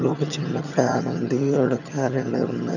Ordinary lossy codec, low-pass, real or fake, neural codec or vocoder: none; 7.2 kHz; fake; vocoder, 22.05 kHz, 80 mel bands, HiFi-GAN